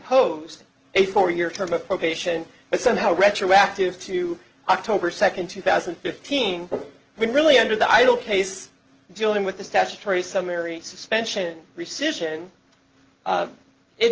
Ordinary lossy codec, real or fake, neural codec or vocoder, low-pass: Opus, 16 kbps; real; none; 7.2 kHz